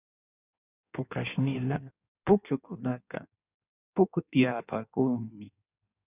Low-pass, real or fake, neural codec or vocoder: 3.6 kHz; fake; codec, 16 kHz in and 24 kHz out, 1.1 kbps, FireRedTTS-2 codec